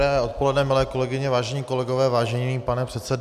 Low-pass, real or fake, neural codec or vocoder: 14.4 kHz; real; none